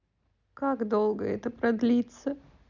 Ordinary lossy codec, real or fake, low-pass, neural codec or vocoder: none; real; 7.2 kHz; none